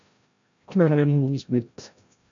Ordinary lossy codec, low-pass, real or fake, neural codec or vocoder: MP3, 64 kbps; 7.2 kHz; fake; codec, 16 kHz, 0.5 kbps, FreqCodec, larger model